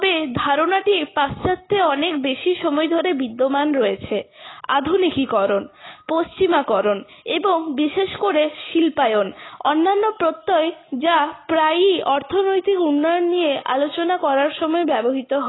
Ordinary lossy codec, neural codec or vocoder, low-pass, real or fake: AAC, 16 kbps; none; 7.2 kHz; real